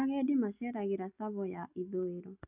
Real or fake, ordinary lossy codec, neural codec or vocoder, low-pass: real; none; none; 3.6 kHz